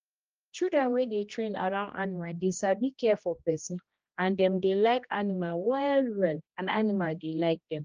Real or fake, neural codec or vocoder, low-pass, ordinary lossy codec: fake; codec, 16 kHz, 1 kbps, X-Codec, HuBERT features, trained on general audio; 7.2 kHz; Opus, 24 kbps